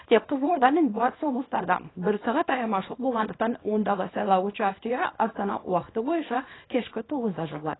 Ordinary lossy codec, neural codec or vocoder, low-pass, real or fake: AAC, 16 kbps; codec, 24 kHz, 0.9 kbps, WavTokenizer, small release; 7.2 kHz; fake